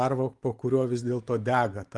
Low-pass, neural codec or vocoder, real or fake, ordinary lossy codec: 10.8 kHz; none; real; Opus, 24 kbps